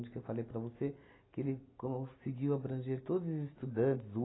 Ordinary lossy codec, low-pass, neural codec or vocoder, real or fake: AAC, 16 kbps; 7.2 kHz; none; real